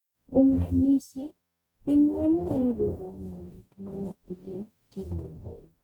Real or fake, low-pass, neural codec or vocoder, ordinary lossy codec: fake; 19.8 kHz; codec, 44.1 kHz, 0.9 kbps, DAC; MP3, 96 kbps